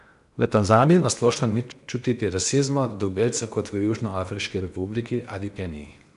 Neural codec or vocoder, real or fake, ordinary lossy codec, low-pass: codec, 16 kHz in and 24 kHz out, 0.8 kbps, FocalCodec, streaming, 65536 codes; fake; none; 10.8 kHz